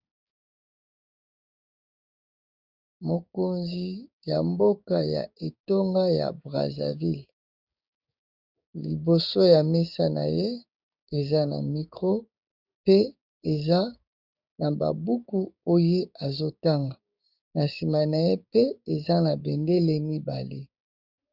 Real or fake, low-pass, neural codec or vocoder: fake; 5.4 kHz; codec, 16 kHz, 6 kbps, DAC